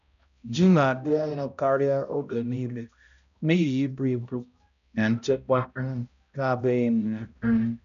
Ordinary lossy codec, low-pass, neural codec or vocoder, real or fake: none; 7.2 kHz; codec, 16 kHz, 0.5 kbps, X-Codec, HuBERT features, trained on balanced general audio; fake